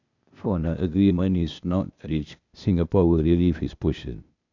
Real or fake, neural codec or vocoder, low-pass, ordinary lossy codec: fake; codec, 16 kHz, 0.8 kbps, ZipCodec; 7.2 kHz; none